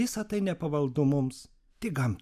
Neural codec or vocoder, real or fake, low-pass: none; real; 14.4 kHz